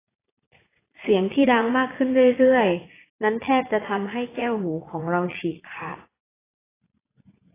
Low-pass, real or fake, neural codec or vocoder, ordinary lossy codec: 3.6 kHz; fake; vocoder, 44.1 kHz, 80 mel bands, Vocos; AAC, 16 kbps